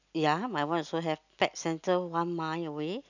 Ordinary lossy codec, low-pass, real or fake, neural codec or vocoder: none; 7.2 kHz; real; none